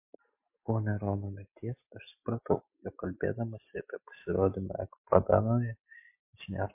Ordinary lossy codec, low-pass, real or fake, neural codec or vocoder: MP3, 24 kbps; 3.6 kHz; real; none